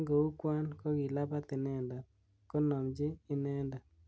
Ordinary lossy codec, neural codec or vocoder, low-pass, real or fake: none; none; none; real